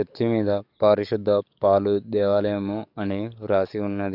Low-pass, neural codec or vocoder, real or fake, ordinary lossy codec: 5.4 kHz; codec, 44.1 kHz, 7.8 kbps, DAC; fake; none